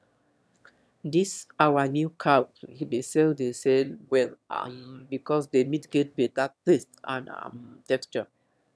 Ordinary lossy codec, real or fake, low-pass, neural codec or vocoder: none; fake; none; autoencoder, 22.05 kHz, a latent of 192 numbers a frame, VITS, trained on one speaker